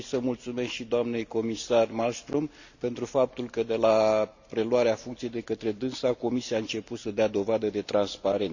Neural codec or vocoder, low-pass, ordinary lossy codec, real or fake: none; 7.2 kHz; none; real